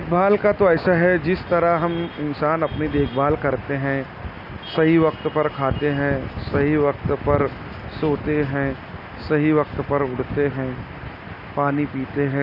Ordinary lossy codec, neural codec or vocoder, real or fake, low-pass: none; none; real; 5.4 kHz